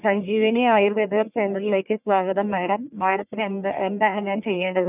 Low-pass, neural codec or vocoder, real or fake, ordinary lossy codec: 3.6 kHz; codec, 44.1 kHz, 1.7 kbps, Pupu-Codec; fake; none